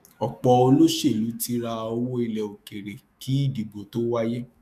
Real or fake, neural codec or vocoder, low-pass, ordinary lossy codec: fake; autoencoder, 48 kHz, 128 numbers a frame, DAC-VAE, trained on Japanese speech; 14.4 kHz; none